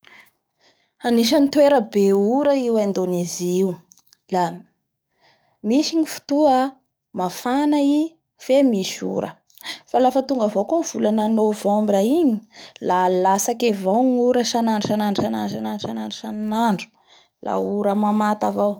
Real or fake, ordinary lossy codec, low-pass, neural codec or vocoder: fake; none; none; codec, 44.1 kHz, 7.8 kbps, DAC